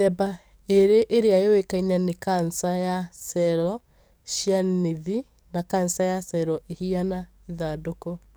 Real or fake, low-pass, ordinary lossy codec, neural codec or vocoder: fake; none; none; codec, 44.1 kHz, 7.8 kbps, DAC